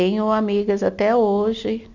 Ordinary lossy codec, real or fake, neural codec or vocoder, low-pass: none; real; none; 7.2 kHz